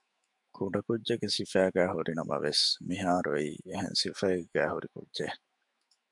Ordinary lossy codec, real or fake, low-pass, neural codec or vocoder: MP3, 96 kbps; fake; 10.8 kHz; autoencoder, 48 kHz, 128 numbers a frame, DAC-VAE, trained on Japanese speech